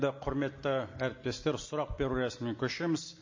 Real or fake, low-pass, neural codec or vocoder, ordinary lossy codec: real; 7.2 kHz; none; MP3, 32 kbps